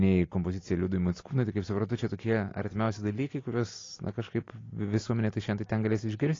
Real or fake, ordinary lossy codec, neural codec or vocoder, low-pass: real; AAC, 32 kbps; none; 7.2 kHz